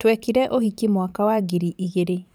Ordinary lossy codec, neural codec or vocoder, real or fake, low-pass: none; vocoder, 44.1 kHz, 128 mel bands every 512 samples, BigVGAN v2; fake; none